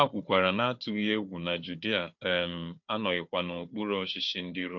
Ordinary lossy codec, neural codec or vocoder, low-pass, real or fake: MP3, 48 kbps; codec, 16 kHz, 4 kbps, FunCodec, trained on Chinese and English, 50 frames a second; 7.2 kHz; fake